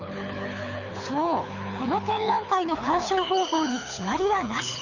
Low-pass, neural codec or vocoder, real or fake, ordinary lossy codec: 7.2 kHz; codec, 24 kHz, 6 kbps, HILCodec; fake; none